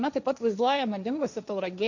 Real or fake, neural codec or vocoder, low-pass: fake; codec, 16 kHz, 1.1 kbps, Voila-Tokenizer; 7.2 kHz